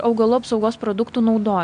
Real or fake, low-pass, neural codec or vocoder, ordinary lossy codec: real; 9.9 kHz; none; MP3, 64 kbps